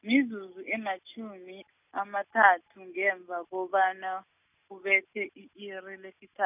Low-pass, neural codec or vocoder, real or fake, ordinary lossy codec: 3.6 kHz; none; real; none